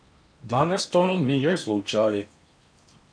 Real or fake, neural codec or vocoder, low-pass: fake; codec, 16 kHz in and 24 kHz out, 0.8 kbps, FocalCodec, streaming, 65536 codes; 9.9 kHz